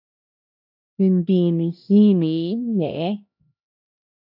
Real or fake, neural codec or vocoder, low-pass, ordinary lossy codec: fake; codec, 16 kHz, 1 kbps, X-Codec, HuBERT features, trained on LibriSpeech; 5.4 kHz; AAC, 48 kbps